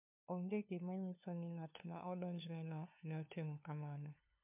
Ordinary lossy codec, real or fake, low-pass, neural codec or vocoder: MP3, 32 kbps; fake; 3.6 kHz; codec, 16 kHz, 2 kbps, FunCodec, trained on LibriTTS, 25 frames a second